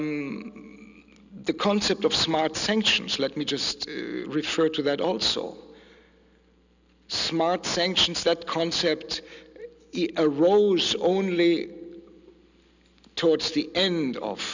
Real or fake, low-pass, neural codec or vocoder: real; 7.2 kHz; none